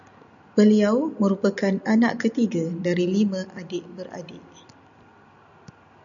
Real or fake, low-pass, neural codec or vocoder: real; 7.2 kHz; none